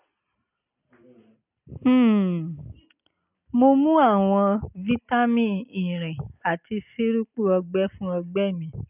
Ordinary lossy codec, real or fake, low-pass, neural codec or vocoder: none; real; 3.6 kHz; none